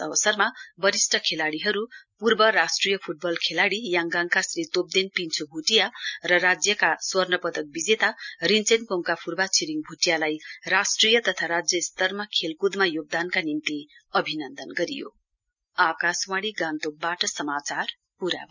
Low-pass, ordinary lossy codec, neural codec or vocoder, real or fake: 7.2 kHz; none; none; real